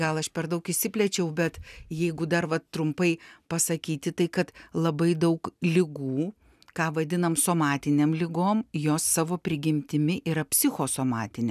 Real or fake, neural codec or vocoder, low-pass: real; none; 14.4 kHz